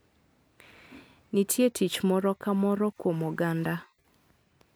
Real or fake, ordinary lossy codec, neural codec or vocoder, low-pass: real; none; none; none